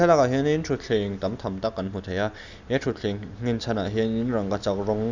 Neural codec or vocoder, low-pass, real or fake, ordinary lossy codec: none; 7.2 kHz; real; none